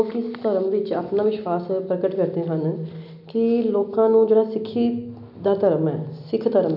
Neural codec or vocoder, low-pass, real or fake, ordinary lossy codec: none; 5.4 kHz; real; MP3, 48 kbps